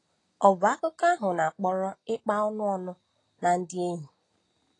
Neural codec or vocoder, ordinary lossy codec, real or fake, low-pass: none; AAC, 32 kbps; real; 10.8 kHz